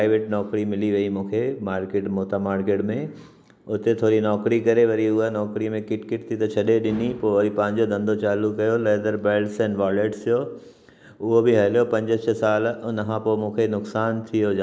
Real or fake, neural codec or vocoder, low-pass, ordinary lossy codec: real; none; none; none